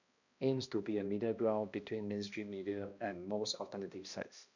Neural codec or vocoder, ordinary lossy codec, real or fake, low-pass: codec, 16 kHz, 1 kbps, X-Codec, HuBERT features, trained on balanced general audio; none; fake; 7.2 kHz